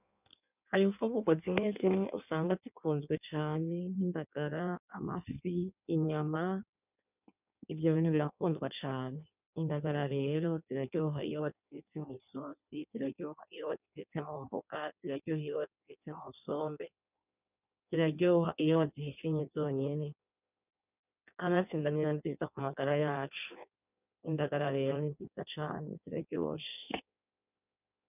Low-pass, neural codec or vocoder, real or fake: 3.6 kHz; codec, 16 kHz in and 24 kHz out, 1.1 kbps, FireRedTTS-2 codec; fake